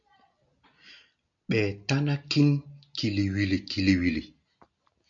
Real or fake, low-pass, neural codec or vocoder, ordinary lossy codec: real; 7.2 kHz; none; MP3, 64 kbps